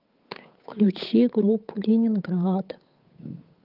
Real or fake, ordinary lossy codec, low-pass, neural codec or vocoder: fake; Opus, 24 kbps; 5.4 kHz; codec, 16 kHz, 8 kbps, FunCodec, trained on Chinese and English, 25 frames a second